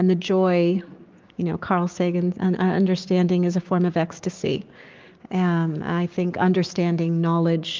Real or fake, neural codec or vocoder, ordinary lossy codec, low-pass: fake; codec, 16 kHz, 6 kbps, DAC; Opus, 24 kbps; 7.2 kHz